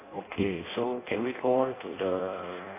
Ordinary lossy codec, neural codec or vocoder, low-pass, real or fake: AAC, 16 kbps; codec, 16 kHz in and 24 kHz out, 0.6 kbps, FireRedTTS-2 codec; 3.6 kHz; fake